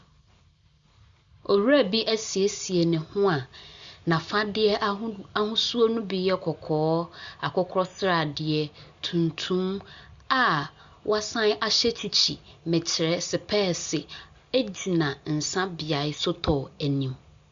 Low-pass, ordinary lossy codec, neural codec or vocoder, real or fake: 7.2 kHz; Opus, 64 kbps; none; real